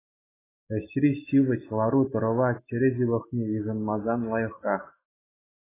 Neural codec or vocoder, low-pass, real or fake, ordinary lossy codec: none; 3.6 kHz; real; AAC, 16 kbps